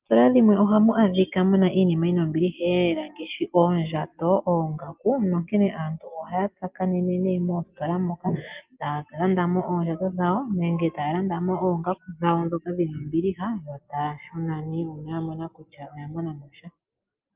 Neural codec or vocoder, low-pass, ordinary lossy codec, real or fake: none; 3.6 kHz; Opus, 24 kbps; real